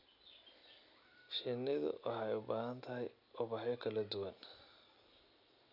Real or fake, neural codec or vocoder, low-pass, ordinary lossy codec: real; none; 5.4 kHz; none